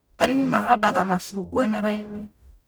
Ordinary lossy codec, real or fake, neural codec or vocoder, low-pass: none; fake; codec, 44.1 kHz, 0.9 kbps, DAC; none